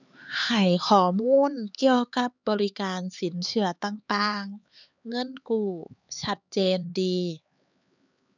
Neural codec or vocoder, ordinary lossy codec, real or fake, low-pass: codec, 16 kHz, 4 kbps, X-Codec, HuBERT features, trained on LibriSpeech; none; fake; 7.2 kHz